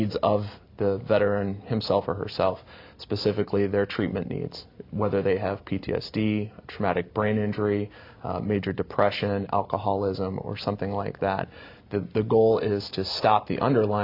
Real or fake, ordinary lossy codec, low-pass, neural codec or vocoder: real; MP3, 48 kbps; 5.4 kHz; none